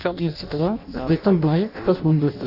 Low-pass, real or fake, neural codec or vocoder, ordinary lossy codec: 5.4 kHz; fake; codec, 16 kHz in and 24 kHz out, 0.6 kbps, FireRedTTS-2 codec; AAC, 48 kbps